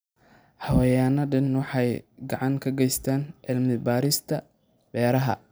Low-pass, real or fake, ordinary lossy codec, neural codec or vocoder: none; real; none; none